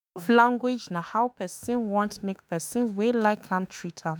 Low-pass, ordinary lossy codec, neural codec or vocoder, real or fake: none; none; autoencoder, 48 kHz, 32 numbers a frame, DAC-VAE, trained on Japanese speech; fake